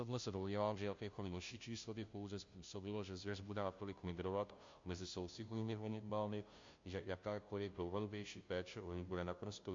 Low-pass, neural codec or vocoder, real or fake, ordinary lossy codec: 7.2 kHz; codec, 16 kHz, 0.5 kbps, FunCodec, trained on Chinese and English, 25 frames a second; fake; MP3, 48 kbps